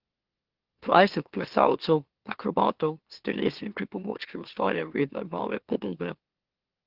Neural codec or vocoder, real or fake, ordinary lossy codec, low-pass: autoencoder, 44.1 kHz, a latent of 192 numbers a frame, MeloTTS; fake; Opus, 16 kbps; 5.4 kHz